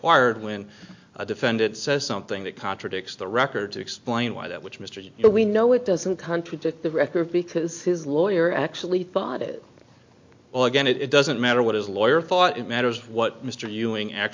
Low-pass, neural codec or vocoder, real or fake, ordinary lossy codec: 7.2 kHz; none; real; MP3, 48 kbps